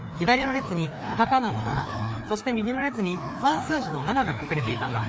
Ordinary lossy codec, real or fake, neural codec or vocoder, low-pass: none; fake; codec, 16 kHz, 2 kbps, FreqCodec, larger model; none